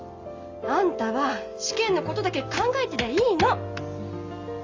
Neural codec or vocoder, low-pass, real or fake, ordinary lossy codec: none; 7.2 kHz; real; Opus, 32 kbps